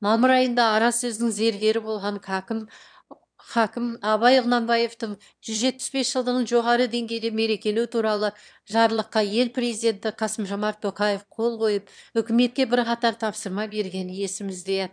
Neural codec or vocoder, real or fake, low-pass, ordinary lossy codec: autoencoder, 22.05 kHz, a latent of 192 numbers a frame, VITS, trained on one speaker; fake; 9.9 kHz; none